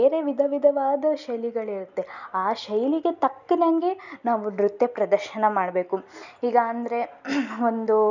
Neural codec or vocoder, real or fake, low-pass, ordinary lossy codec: none; real; 7.2 kHz; none